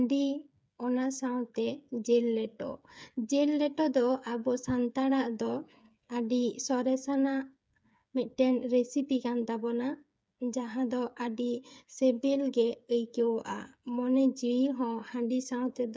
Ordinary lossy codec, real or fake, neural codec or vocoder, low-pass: none; fake; codec, 16 kHz, 8 kbps, FreqCodec, smaller model; none